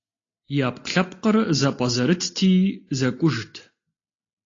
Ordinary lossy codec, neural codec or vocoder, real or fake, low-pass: AAC, 32 kbps; none; real; 7.2 kHz